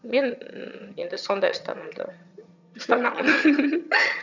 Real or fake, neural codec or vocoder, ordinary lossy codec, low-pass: fake; vocoder, 22.05 kHz, 80 mel bands, HiFi-GAN; none; 7.2 kHz